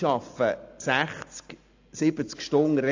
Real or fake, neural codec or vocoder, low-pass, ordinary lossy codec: real; none; 7.2 kHz; AAC, 48 kbps